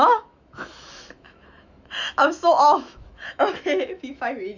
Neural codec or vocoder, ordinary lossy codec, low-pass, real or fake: autoencoder, 48 kHz, 128 numbers a frame, DAC-VAE, trained on Japanese speech; none; 7.2 kHz; fake